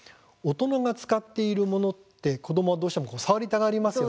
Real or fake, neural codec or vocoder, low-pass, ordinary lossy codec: real; none; none; none